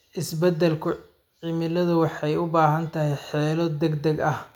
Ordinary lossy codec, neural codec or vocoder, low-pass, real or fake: none; none; 19.8 kHz; real